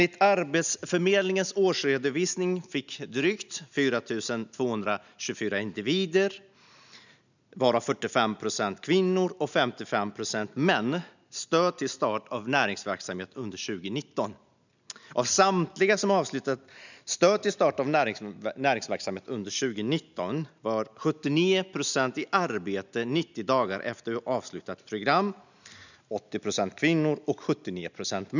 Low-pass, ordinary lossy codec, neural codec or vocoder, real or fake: 7.2 kHz; none; none; real